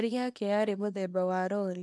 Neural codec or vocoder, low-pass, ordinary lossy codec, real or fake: codec, 24 kHz, 0.9 kbps, WavTokenizer, small release; none; none; fake